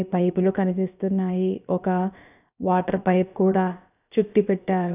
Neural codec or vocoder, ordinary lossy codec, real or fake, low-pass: codec, 16 kHz, about 1 kbps, DyCAST, with the encoder's durations; none; fake; 3.6 kHz